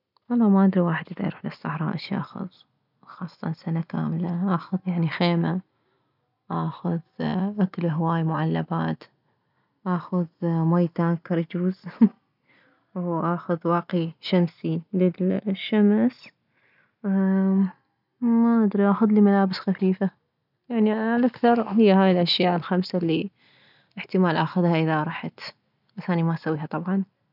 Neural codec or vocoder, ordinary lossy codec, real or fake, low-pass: none; none; real; 5.4 kHz